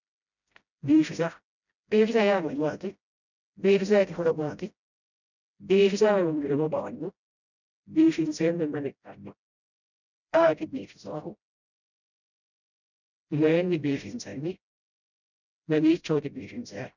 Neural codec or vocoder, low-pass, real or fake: codec, 16 kHz, 0.5 kbps, FreqCodec, smaller model; 7.2 kHz; fake